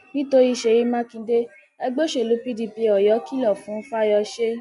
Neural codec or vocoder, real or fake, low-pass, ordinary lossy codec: none; real; 10.8 kHz; none